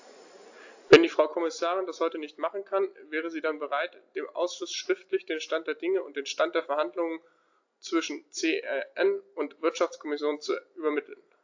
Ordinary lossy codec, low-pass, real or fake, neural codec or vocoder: AAC, 48 kbps; 7.2 kHz; real; none